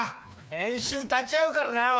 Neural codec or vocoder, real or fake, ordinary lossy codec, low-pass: codec, 16 kHz, 2 kbps, FreqCodec, larger model; fake; none; none